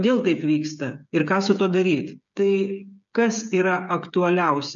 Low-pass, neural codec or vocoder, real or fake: 7.2 kHz; codec, 16 kHz, 8 kbps, FreqCodec, smaller model; fake